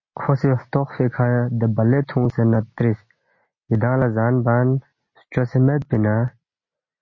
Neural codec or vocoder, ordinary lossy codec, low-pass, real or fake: none; MP3, 32 kbps; 7.2 kHz; real